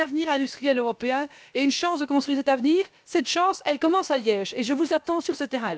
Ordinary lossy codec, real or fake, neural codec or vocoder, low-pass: none; fake; codec, 16 kHz, about 1 kbps, DyCAST, with the encoder's durations; none